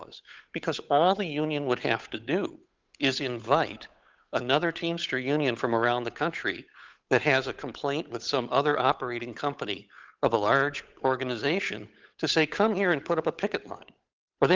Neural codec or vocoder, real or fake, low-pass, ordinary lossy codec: codec, 16 kHz, 8 kbps, FunCodec, trained on Chinese and English, 25 frames a second; fake; 7.2 kHz; Opus, 32 kbps